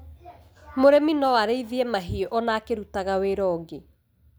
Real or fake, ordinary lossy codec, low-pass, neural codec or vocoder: real; none; none; none